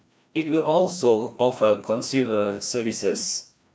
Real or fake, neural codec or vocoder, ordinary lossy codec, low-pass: fake; codec, 16 kHz, 1 kbps, FreqCodec, larger model; none; none